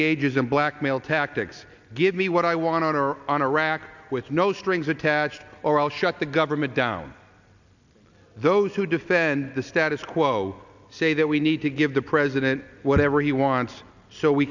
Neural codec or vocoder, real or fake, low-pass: none; real; 7.2 kHz